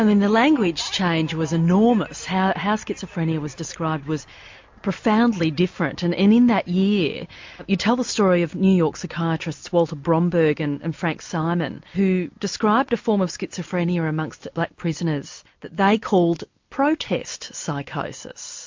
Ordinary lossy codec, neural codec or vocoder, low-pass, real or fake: MP3, 48 kbps; none; 7.2 kHz; real